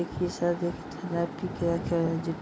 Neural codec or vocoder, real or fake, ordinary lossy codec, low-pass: none; real; none; none